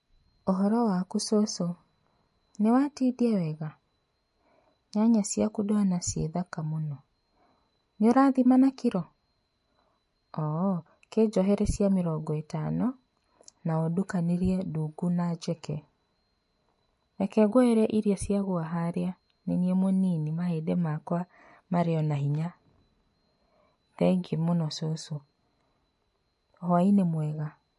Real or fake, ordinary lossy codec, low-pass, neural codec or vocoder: real; MP3, 48 kbps; 14.4 kHz; none